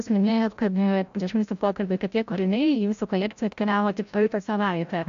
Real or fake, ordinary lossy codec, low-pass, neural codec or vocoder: fake; AAC, 64 kbps; 7.2 kHz; codec, 16 kHz, 0.5 kbps, FreqCodec, larger model